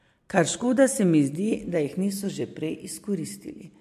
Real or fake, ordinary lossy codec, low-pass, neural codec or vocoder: real; MP3, 64 kbps; 14.4 kHz; none